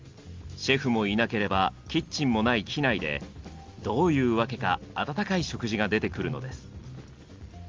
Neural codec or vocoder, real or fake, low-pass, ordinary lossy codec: none; real; 7.2 kHz; Opus, 32 kbps